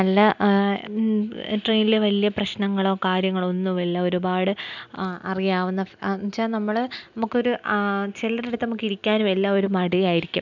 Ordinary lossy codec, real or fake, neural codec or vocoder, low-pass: none; real; none; 7.2 kHz